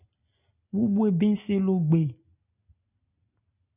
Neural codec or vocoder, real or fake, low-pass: none; real; 3.6 kHz